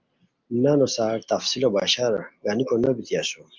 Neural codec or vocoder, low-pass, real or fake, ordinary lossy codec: none; 7.2 kHz; real; Opus, 32 kbps